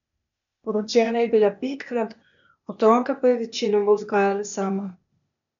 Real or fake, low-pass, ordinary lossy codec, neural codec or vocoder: fake; 7.2 kHz; none; codec, 16 kHz, 0.8 kbps, ZipCodec